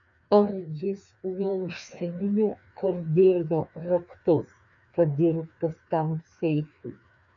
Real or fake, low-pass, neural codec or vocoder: fake; 7.2 kHz; codec, 16 kHz, 2 kbps, FreqCodec, larger model